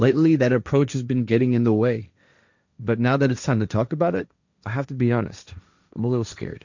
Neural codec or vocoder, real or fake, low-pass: codec, 16 kHz, 1.1 kbps, Voila-Tokenizer; fake; 7.2 kHz